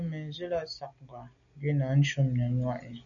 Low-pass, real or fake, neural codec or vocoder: 7.2 kHz; real; none